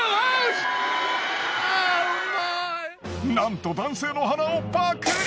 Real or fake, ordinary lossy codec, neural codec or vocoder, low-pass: real; none; none; none